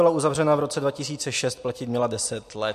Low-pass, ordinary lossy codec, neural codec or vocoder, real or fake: 14.4 kHz; MP3, 64 kbps; none; real